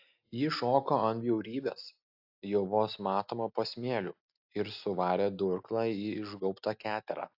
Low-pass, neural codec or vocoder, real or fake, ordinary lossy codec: 5.4 kHz; none; real; AAC, 48 kbps